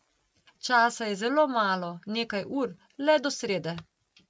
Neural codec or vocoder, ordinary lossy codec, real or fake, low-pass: none; none; real; none